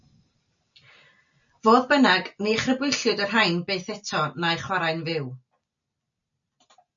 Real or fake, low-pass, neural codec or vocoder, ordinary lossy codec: real; 7.2 kHz; none; MP3, 96 kbps